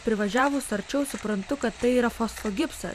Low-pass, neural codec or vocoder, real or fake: 14.4 kHz; vocoder, 44.1 kHz, 128 mel bands every 512 samples, BigVGAN v2; fake